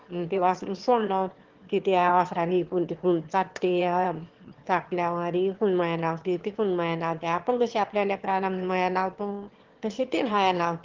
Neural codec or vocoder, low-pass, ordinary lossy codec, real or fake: autoencoder, 22.05 kHz, a latent of 192 numbers a frame, VITS, trained on one speaker; 7.2 kHz; Opus, 16 kbps; fake